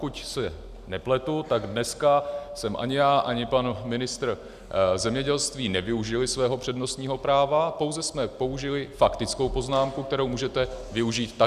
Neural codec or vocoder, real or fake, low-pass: none; real; 14.4 kHz